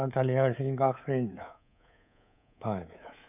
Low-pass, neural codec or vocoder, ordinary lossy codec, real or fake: 3.6 kHz; codec, 24 kHz, 3.1 kbps, DualCodec; none; fake